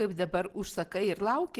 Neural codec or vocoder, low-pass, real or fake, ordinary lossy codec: none; 14.4 kHz; real; Opus, 16 kbps